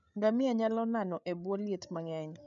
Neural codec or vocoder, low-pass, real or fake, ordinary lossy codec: codec, 16 kHz, 8 kbps, FreqCodec, larger model; 7.2 kHz; fake; none